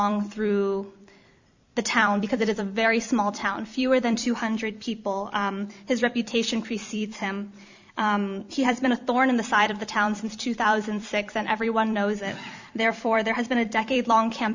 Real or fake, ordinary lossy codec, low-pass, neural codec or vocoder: fake; Opus, 64 kbps; 7.2 kHz; vocoder, 44.1 kHz, 128 mel bands every 256 samples, BigVGAN v2